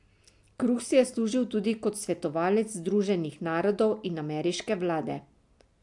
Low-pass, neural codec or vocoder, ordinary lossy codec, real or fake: 10.8 kHz; none; AAC, 64 kbps; real